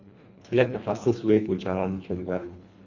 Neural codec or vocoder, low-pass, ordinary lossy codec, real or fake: codec, 24 kHz, 1.5 kbps, HILCodec; 7.2 kHz; Opus, 64 kbps; fake